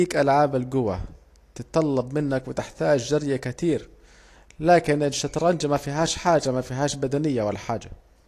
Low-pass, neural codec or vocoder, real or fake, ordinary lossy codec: 14.4 kHz; none; real; AAC, 48 kbps